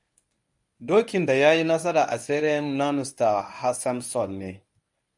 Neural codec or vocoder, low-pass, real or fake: codec, 24 kHz, 0.9 kbps, WavTokenizer, medium speech release version 1; 10.8 kHz; fake